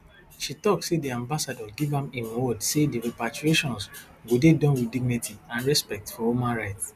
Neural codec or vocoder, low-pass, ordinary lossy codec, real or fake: none; 14.4 kHz; none; real